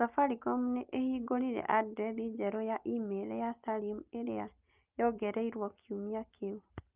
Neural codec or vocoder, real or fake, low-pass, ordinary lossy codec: none; real; 3.6 kHz; Opus, 32 kbps